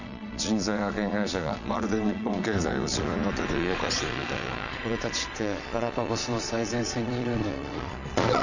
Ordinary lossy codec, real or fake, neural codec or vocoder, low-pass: none; fake; vocoder, 22.05 kHz, 80 mel bands, Vocos; 7.2 kHz